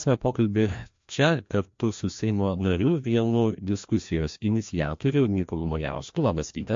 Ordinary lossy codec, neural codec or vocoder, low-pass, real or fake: MP3, 48 kbps; codec, 16 kHz, 1 kbps, FreqCodec, larger model; 7.2 kHz; fake